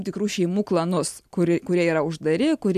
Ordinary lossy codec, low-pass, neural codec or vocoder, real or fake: MP3, 96 kbps; 14.4 kHz; none; real